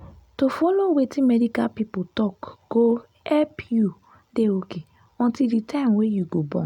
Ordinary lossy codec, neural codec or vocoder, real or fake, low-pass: none; none; real; 19.8 kHz